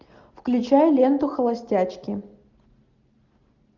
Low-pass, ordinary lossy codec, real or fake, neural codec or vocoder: 7.2 kHz; Opus, 64 kbps; real; none